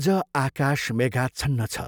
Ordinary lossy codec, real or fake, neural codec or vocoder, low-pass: none; real; none; none